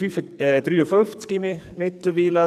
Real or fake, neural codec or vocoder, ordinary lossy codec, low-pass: fake; codec, 44.1 kHz, 2.6 kbps, SNAC; none; 14.4 kHz